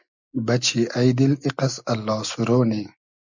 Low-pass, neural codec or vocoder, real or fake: 7.2 kHz; none; real